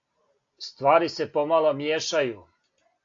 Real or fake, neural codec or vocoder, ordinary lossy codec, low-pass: real; none; AAC, 48 kbps; 7.2 kHz